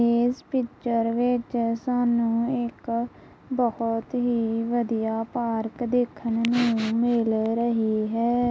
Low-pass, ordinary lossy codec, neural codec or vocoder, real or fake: none; none; none; real